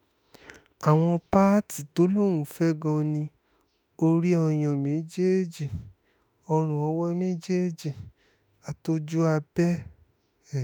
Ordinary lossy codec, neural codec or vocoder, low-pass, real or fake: none; autoencoder, 48 kHz, 32 numbers a frame, DAC-VAE, trained on Japanese speech; none; fake